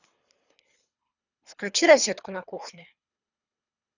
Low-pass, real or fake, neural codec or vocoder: 7.2 kHz; fake; codec, 24 kHz, 3 kbps, HILCodec